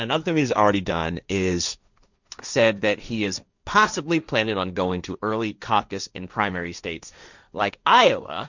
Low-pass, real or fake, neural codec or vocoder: 7.2 kHz; fake; codec, 16 kHz, 1.1 kbps, Voila-Tokenizer